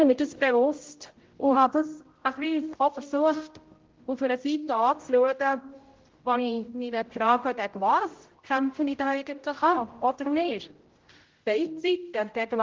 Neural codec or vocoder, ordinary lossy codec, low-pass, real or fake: codec, 16 kHz, 0.5 kbps, X-Codec, HuBERT features, trained on general audio; Opus, 16 kbps; 7.2 kHz; fake